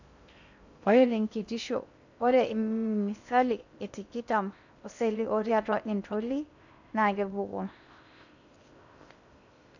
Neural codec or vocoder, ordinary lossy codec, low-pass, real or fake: codec, 16 kHz in and 24 kHz out, 0.6 kbps, FocalCodec, streaming, 2048 codes; none; 7.2 kHz; fake